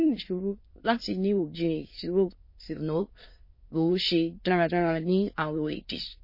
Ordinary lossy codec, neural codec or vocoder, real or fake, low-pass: MP3, 24 kbps; autoencoder, 22.05 kHz, a latent of 192 numbers a frame, VITS, trained on many speakers; fake; 5.4 kHz